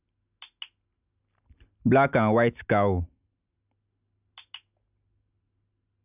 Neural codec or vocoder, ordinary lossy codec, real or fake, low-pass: none; none; real; 3.6 kHz